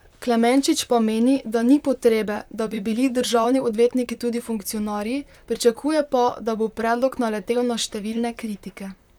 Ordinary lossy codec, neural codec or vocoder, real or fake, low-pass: none; vocoder, 44.1 kHz, 128 mel bands, Pupu-Vocoder; fake; 19.8 kHz